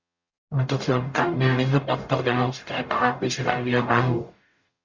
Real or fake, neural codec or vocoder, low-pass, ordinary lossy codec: fake; codec, 44.1 kHz, 0.9 kbps, DAC; 7.2 kHz; Opus, 64 kbps